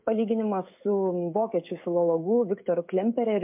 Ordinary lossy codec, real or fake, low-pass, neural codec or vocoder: MP3, 32 kbps; fake; 3.6 kHz; codec, 16 kHz, 16 kbps, FreqCodec, smaller model